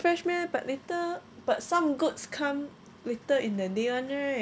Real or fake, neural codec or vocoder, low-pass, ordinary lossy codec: real; none; none; none